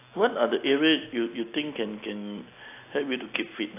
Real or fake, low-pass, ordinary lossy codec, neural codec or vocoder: real; 3.6 kHz; AAC, 24 kbps; none